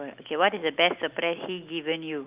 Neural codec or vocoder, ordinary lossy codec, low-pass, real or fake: none; Opus, 24 kbps; 3.6 kHz; real